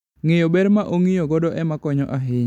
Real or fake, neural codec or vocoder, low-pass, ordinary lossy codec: real; none; 19.8 kHz; MP3, 96 kbps